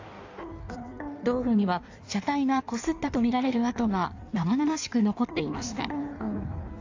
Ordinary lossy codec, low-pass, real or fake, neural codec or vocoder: AAC, 48 kbps; 7.2 kHz; fake; codec, 16 kHz in and 24 kHz out, 1.1 kbps, FireRedTTS-2 codec